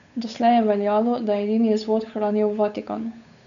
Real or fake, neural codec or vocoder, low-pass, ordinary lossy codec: fake; codec, 16 kHz, 8 kbps, FunCodec, trained on Chinese and English, 25 frames a second; 7.2 kHz; none